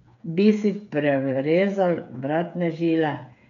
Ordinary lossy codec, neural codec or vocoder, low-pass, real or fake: none; codec, 16 kHz, 8 kbps, FreqCodec, smaller model; 7.2 kHz; fake